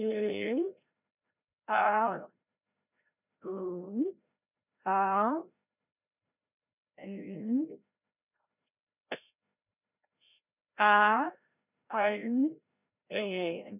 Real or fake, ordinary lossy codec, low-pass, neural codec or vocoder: fake; none; 3.6 kHz; codec, 16 kHz, 0.5 kbps, FreqCodec, larger model